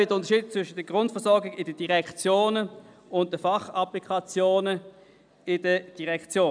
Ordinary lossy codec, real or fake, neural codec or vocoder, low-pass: none; real; none; 9.9 kHz